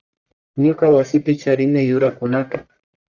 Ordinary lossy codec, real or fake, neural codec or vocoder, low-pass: Opus, 64 kbps; fake; codec, 44.1 kHz, 1.7 kbps, Pupu-Codec; 7.2 kHz